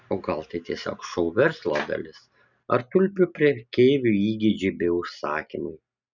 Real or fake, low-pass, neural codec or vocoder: real; 7.2 kHz; none